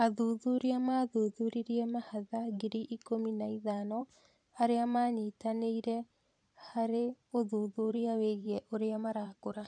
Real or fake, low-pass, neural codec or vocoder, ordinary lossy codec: fake; 9.9 kHz; vocoder, 44.1 kHz, 128 mel bands every 512 samples, BigVGAN v2; none